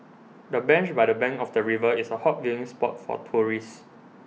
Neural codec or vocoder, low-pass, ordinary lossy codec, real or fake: none; none; none; real